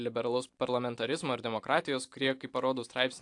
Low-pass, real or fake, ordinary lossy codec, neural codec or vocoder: 10.8 kHz; fake; AAC, 64 kbps; vocoder, 44.1 kHz, 128 mel bands every 512 samples, BigVGAN v2